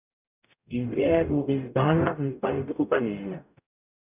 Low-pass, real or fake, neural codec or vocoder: 3.6 kHz; fake; codec, 44.1 kHz, 0.9 kbps, DAC